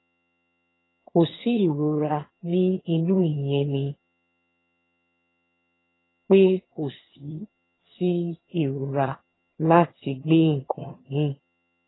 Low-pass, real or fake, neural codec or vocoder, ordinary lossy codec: 7.2 kHz; fake; vocoder, 22.05 kHz, 80 mel bands, HiFi-GAN; AAC, 16 kbps